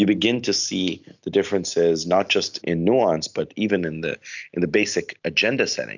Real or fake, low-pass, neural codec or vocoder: real; 7.2 kHz; none